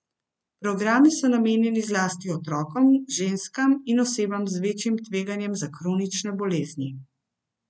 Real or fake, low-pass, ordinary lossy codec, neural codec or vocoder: real; none; none; none